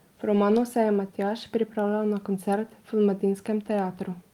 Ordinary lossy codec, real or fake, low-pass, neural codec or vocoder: Opus, 32 kbps; real; 19.8 kHz; none